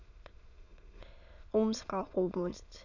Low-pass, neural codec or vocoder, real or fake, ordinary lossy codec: 7.2 kHz; autoencoder, 22.05 kHz, a latent of 192 numbers a frame, VITS, trained on many speakers; fake; none